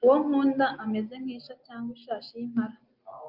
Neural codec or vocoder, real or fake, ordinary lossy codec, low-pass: none; real; Opus, 24 kbps; 5.4 kHz